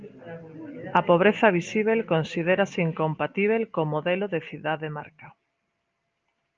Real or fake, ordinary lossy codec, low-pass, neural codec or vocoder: real; Opus, 24 kbps; 7.2 kHz; none